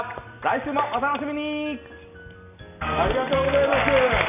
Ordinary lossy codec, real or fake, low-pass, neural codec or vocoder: none; real; 3.6 kHz; none